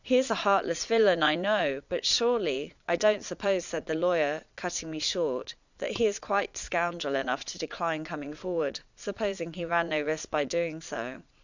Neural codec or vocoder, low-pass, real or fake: vocoder, 22.05 kHz, 80 mel bands, Vocos; 7.2 kHz; fake